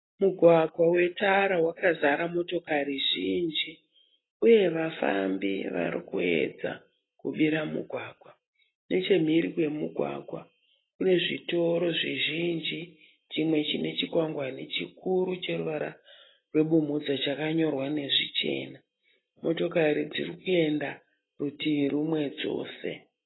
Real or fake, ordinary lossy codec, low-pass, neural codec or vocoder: real; AAC, 16 kbps; 7.2 kHz; none